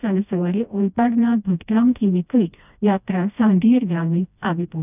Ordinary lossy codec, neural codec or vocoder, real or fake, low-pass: none; codec, 16 kHz, 1 kbps, FreqCodec, smaller model; fake; 3.6 kHz